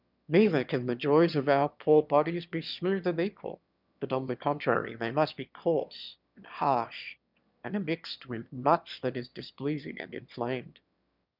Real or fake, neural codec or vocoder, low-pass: fake; autoencoder, 22.05 kHz, a latent of 192 numbers a frame, VITS, trained on one speaker; 5.4 kHz